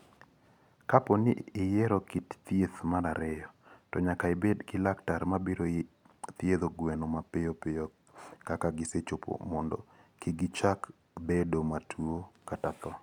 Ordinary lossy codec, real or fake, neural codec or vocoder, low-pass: none; real; none; 19.8 kHz